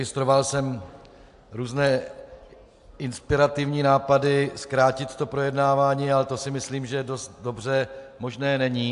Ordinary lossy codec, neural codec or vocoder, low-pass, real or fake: AAC, 64 kbps; none; 10.8 kHz; real